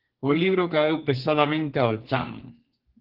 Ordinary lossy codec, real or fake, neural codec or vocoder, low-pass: Opus, 16 kbps; fake; codec, 32 kHz, 1.9 kbps, SNAC; 5.4 kHz